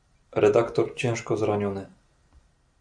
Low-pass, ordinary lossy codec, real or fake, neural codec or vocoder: 9.9 kHz; MP3, 48 kbps; real; none